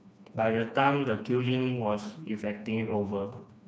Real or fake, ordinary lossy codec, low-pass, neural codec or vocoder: fake; none; none; codec, 16 kHz, 2 kbps, FreqCodec, smaller model